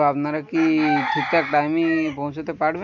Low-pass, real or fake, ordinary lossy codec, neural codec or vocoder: 7.2 kHz; real; none; none